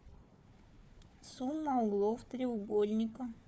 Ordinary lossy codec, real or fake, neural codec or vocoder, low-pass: none; fake; codec, 16 kHz, 4 kbps, FunCodec, trained on Chinese and English, 50 frames a second; none